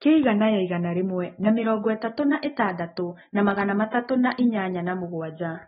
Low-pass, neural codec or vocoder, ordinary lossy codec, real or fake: 7.2 kHz; none; AAC, 16 kbps; real